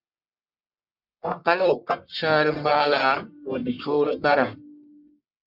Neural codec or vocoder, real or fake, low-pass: codec, 44.1 kHz, 1.7 kbps, Pupu-Codec; fake; 5.4 kHz